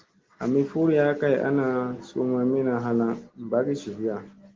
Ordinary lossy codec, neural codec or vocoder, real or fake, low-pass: Opus, 16 kbps; none; real; 7.2 kHz